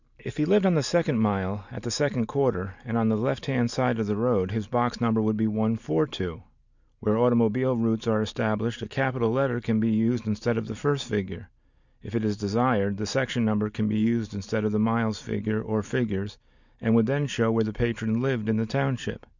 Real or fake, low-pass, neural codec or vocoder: real; 7.2 kHz; none